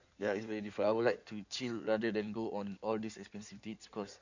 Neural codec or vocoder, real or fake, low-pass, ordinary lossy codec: codec, 16 kHz in and 24 kHz out, 2.2 kbps, FireRedTTS-2 codec; fake; 7.2 kHz; none